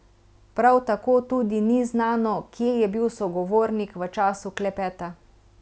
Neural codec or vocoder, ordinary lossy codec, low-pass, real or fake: none; none; none; real